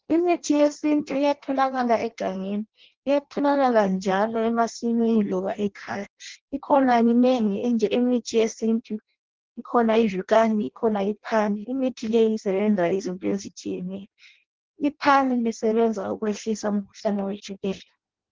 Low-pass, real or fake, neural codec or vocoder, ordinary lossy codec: 7.2 kHz; fake; codec, 16 kHz in and 24 kHz out, 0.6 kbps, FireRedTTS-2 codec; Opus, 16 kbps